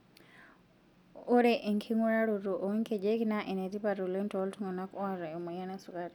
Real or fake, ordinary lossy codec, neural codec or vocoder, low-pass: real; none; none; 19.8 kHz